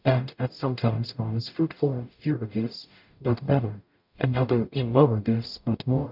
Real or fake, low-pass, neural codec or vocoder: fake; 5.4 kHz; codec, 44.1 kHz, 0.9 kbps, DAC